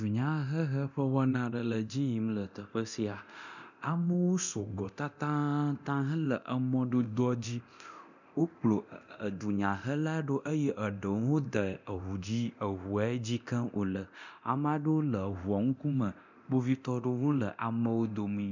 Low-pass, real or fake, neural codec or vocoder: 7.2 kHz; fake; codec, 24 kHz, 0.9 kbps, DualCodec